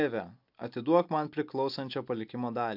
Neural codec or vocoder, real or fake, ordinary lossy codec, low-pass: none; real; MP3, 48 kbps; 5.4 kHz